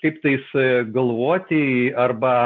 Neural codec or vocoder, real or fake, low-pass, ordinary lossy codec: none; real; 7.2 kHz; MP3, 64 kbps